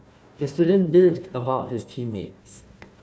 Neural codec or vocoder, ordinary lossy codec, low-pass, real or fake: codec, 16 kHz, 1 kbps, FunCodec, trained on Chinese and English, 50 frames a second; none; none; fake